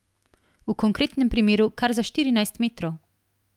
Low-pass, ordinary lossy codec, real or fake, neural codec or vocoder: 19.8 kHz; Opus, 32 kbps; fake; autoencoder, 48 kHz, 128 numbers a frame, DAC-VAE, trained on Japanese speech